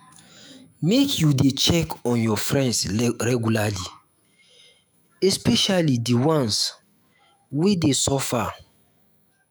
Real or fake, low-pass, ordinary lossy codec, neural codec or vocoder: fake; none; none; autoencoder, 48 kHz, 128 numbers a frame, DAC-VAE, trained on Japanese speech